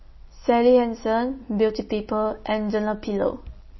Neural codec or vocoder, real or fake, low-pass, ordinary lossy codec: none; real; 7.2 kHz; MP3, 24 kbps